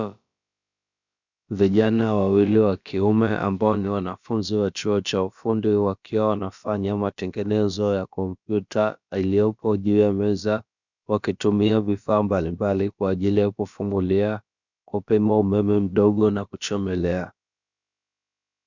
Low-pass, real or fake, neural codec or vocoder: 7.2 kHz; fake; codec, 16 kHz, about 1 kbps, DyCAST, with the encoder's durations